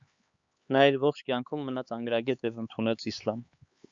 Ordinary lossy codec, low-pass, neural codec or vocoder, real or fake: Opus, 64 kbps; 7.2 kHz; codec, 16 kHz, 4 kbps, X-Codec, HuBERT features, trained on LibriSpeech; fake